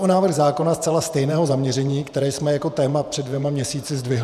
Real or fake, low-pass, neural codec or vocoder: fake; 14.4 kHz; vocoder, 48 kHz, 128 mel bands, Vocos